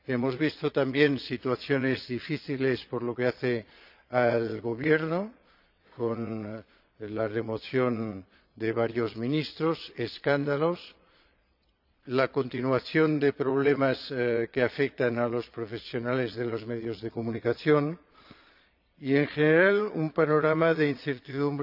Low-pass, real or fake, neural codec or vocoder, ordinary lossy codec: 5.4 kHz; fake; vocoder, 22.05 kHz, 80 mel bands, Vocos; none